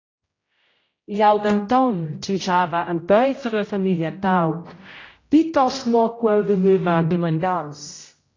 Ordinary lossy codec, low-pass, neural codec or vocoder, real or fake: AAC, 32 kbps; 7.2 kHz; codec, 16 kHz, 0.5 kbps, X-Codec, HuBERT features, trained on general audio; fake